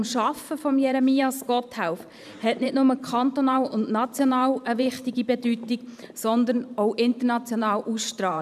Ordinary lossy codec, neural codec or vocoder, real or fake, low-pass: none; vocoder, 44.1 kHz, 128 mel bands every 512 samples, BigVGAN v2; fake; 14.4 kHz